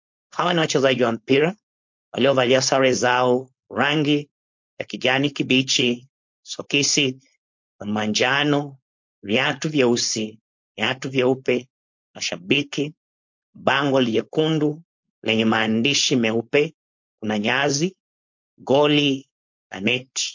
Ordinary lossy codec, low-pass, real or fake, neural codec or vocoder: MP3, 48 kbps; 7.2 kHz; fake; codec, 16 kHz, 4.8 kbps, FACodec